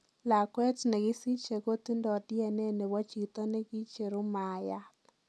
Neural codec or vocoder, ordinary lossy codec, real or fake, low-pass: none; none; real; none